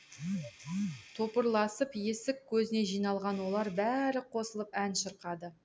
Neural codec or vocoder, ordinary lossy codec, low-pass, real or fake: none; none; none; real